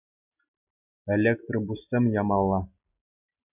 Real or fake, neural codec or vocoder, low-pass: real; none; 3.6 kHz